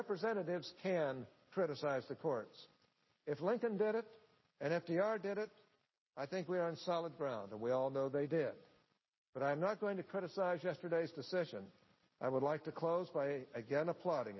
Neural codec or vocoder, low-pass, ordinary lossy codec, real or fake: none; 7.2 kHz; MP3, 24 kbps; real